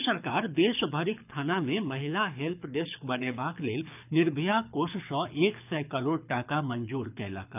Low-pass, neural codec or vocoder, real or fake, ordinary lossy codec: 3.6 kHz; codec, 24 kHz, 6 kbps, HILCodec; fake; none